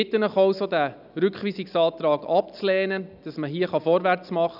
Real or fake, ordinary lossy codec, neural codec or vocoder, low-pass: real; none; none; 5.4 kHz